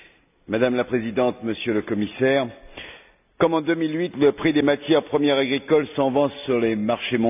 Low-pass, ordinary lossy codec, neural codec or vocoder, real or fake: 3.6 kHz; none; none; real